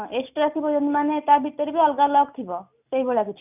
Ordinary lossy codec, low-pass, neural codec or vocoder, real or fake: none; 3.6 kHz; none; real